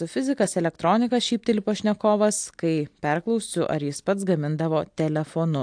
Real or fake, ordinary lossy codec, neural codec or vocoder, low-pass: real; AAC, 64 kbps; none; 9.9 kHz